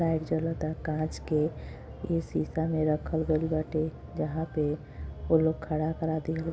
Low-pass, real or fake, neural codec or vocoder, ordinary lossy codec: none; real; none; none